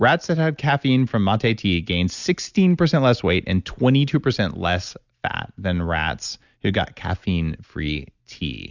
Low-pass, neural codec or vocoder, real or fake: 7.2 kHz; none; real